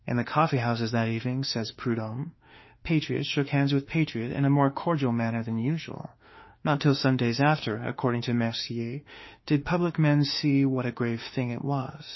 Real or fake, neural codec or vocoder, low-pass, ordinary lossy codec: fake; autoencoder, 48 kHz, 32 numbers a frame, DAC-VAE, trained on Japanese speech; 7.2 kHz; MP3, 24 kbps